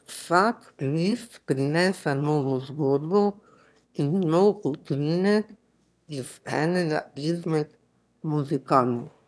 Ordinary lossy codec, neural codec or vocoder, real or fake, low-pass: none; autoencoder, 22.05 kHz, a latent of 192 numbers a frame, VITS, trained on one speaker; fake; none